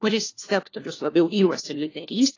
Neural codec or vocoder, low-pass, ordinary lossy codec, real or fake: codec, 16 kHz, 1 kbps, FunCodec, trained on LibriTTS, 50 frames a second; 7.2 kHz; AAC, 32 kbps; fake